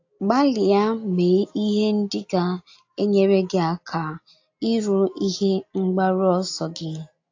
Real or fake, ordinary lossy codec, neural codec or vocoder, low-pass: real; AAC, 48 kbps; none; 7.2 kHz